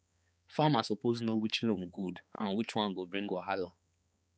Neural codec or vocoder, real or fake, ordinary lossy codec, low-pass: codec, 16 kHz, 4 kbps, X-Codec, HuBERT features, trained on balanced general audio; fake; none; none